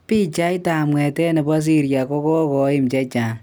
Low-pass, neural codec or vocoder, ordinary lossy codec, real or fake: none; none; none; real